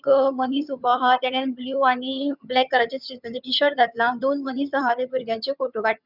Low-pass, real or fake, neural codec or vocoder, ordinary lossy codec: 5.4 kHz; fake; vocoder, 22.05 kHz, 80 mel bands, HiFi-GAN; none